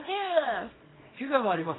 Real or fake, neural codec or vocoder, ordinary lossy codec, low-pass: fake; codec, 24 kHz, 0.9 kbps, WavTokenizer, small release; AAC, 16 kbps; 7.2 kHz